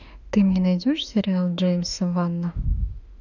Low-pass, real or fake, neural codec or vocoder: 7.2 kHz; fake; autoencoder, 48 kHz, 32 numbers a frame, DAC-VAE, trained on Japanese speech